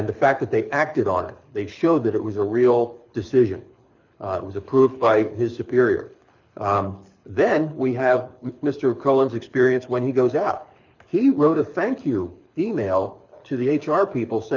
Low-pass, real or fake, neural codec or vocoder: 7.2 kHz; fake; codec, 24 kHz, 6 kbps, HILCodec